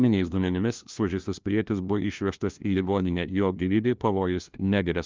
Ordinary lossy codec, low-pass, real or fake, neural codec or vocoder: Opus, 24 kbps; 7.2 kHz; fake; codec, 16 kHz, 1 kbps, FunCodec, trained on LibriTTS, 50 frames a second